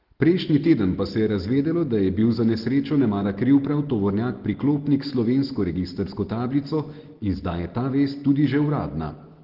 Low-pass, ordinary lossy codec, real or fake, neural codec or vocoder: 5.4 kHz; Opus, 16 kbps; real; none